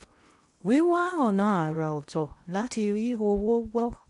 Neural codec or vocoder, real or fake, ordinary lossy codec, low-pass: codec, 16 kHz in and 24 kHz out, 0.8 kbps, FocalCodec, streaming, 65536 codes; fake; none; 10.8 kHz